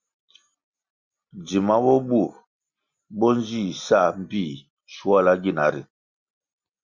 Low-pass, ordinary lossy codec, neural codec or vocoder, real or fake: 7.2 kHz; Opus, 64 kbps; none; real